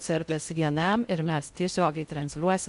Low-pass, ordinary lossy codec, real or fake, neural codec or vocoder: 10.8 kHz; MP3, 96 kbps; fake; codec, 16 kHz in and 24 kHz out, 0.6 kbps, FocalCodec, streaming, 4096 codes